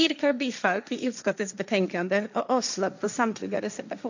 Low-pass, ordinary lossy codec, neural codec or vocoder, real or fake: 7.2 kHz; none; codec, 16 kHz, 1.1 kbps, Voila-Tokenizer; fake